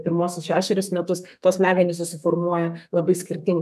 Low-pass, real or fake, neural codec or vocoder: 14.4 kHz; fake; codec, 32 kHz, 1.9 kbps, SNAC